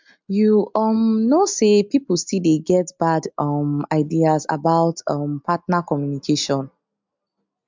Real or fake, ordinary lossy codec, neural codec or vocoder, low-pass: real; MP3, 64 kbps; none; 7.2 kHz